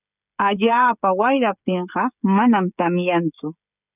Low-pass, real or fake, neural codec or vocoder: 3.6 kHz; fake; codec, 16 kHz, 16 kbps, FreqCodec, smaller model